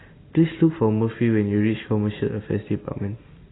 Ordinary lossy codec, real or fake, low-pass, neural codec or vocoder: AAC, 16 kbps; real; 7.2 kHz; none